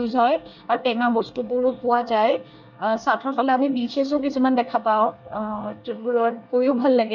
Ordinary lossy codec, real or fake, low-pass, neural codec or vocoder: none; fake; 7.2 kHz; codec, 24 kHz, 1 kbps, SNAC